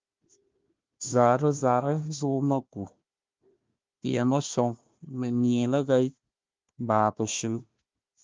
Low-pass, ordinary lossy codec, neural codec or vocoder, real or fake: 7.2 kHz; Opus, 32 kbps; codec, 16 kHz, 1 kbps, FunCodec, trained on Chinese and English, 50 frames a second; fake